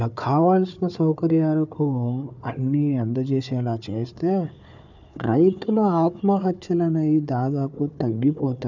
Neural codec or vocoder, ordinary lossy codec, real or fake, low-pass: codec, 16 kHz, 4 kbps, FunCodec, trained on Chinese and English, 50 frames a second; none; fake; 7.2 kHz